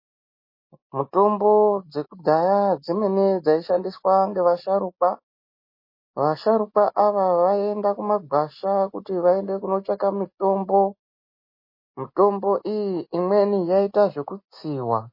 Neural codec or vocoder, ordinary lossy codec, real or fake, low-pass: none; MP3, 24 kbps; real; 5.4 kHz